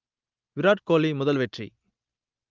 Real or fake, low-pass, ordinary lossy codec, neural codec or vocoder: real; 7.2 kHz; Opus, 24 kbps; none